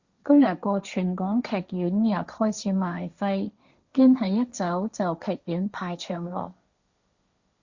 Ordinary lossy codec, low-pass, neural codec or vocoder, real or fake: Opus, 64 kbps; 7.2 kHz; codec, 16 kHz, 1.1 kbps, Voila-Tokenizer; fake